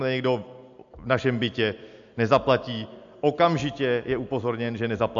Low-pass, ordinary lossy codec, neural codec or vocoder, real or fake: 7.2 kHz; AAC, 64 kbps; none; real